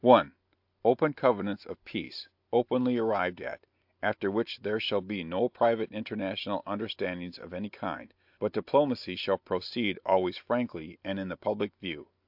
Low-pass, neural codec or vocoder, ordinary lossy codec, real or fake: 5.4 kHz; none; AAC, 48 kbps; real